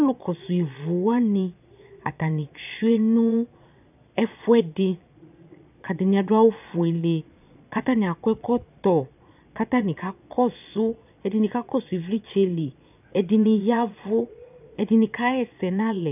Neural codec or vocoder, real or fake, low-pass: vocoder, 22.05 kHz, 80 mel bands, Vocos; fake; 3.6 kHz